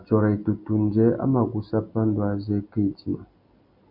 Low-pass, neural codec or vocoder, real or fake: 5.4 kHz; none; real